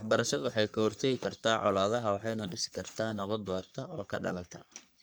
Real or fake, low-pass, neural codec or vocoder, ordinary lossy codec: fake; none; codec, 44.1 kHz, 3.4 kbps, Pupu-Codec; none